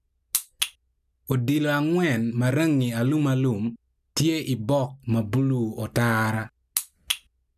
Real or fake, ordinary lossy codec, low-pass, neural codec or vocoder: real; none; 14.4 kHz; none